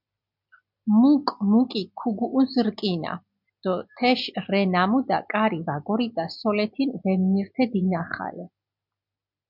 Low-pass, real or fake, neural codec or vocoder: 5.4 kHz; real; none